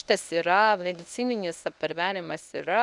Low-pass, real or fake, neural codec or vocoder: 10.8 kHz; fake; codec, 24 kHz, 0.9 kbps, WavTokenizer, medium speech release version 1